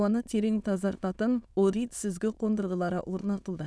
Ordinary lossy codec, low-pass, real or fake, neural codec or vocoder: none; none; fake; autoencoder, 22.05 kHz, a latent of 192 numbers a frame, VITS, trained on many speakers